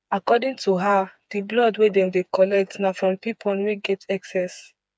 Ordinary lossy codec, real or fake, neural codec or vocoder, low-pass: none; fake; codec, 16 kHz, 4 kbps, FreqCodec, smaller model; none